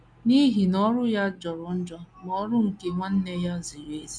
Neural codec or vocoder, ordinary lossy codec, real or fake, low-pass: none; none; real; 9.9 kHz